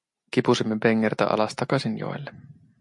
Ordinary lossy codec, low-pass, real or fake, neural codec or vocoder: MP3, 48 kbps; 10.8 kHz; real; none